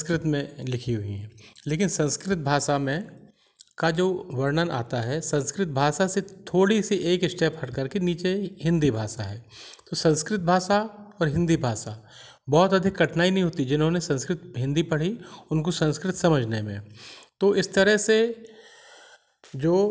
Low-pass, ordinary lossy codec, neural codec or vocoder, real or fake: none; none; none; real